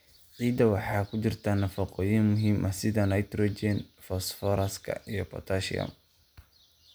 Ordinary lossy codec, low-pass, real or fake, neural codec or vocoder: none; none; real; none